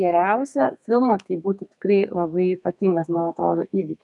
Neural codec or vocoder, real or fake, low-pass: codec, 32 kHz, 1.9 kbps, SNAC; fake; 10.8 kHz